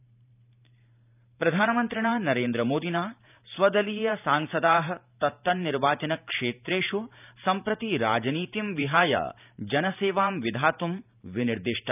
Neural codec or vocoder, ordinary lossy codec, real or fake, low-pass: vocoder, 44.1 kHz, 128 mel bands every 512 samples, BigVGAN v2; none; fake; 3.6 kHz